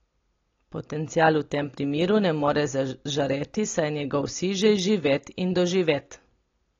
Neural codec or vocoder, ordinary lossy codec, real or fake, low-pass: none; AAC, 32 kbps; real; 7.2 kHz